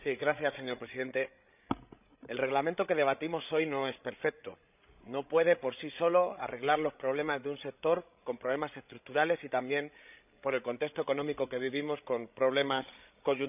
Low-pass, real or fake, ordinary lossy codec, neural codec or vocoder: 3.6 kHz; fake; none; codec, 16 kHz, 16 kbps, FreqCodec, larger model